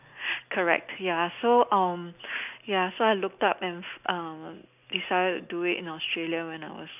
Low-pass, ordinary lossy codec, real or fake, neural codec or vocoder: 3.6 kHz; none; real; none